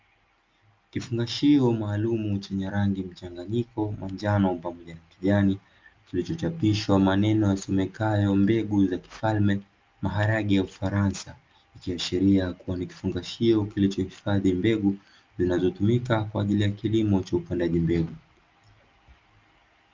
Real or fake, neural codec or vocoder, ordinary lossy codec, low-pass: real; none; Opus, 24 kbps; 7.2 kHz